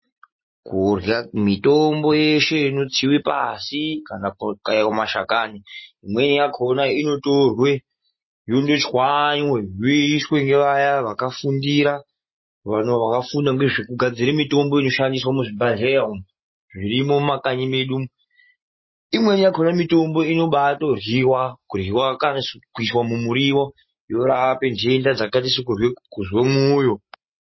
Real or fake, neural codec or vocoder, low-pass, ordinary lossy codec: real; none; 7.2 kHz; MP3, 24 kbps